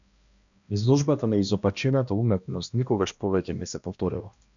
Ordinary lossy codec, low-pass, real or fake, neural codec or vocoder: MP3, 96 kbps; 7.2 kHz; fake; codec, 16 kHz, 1 kbps, X-Codec, HuBERT features, trained on balanced general audio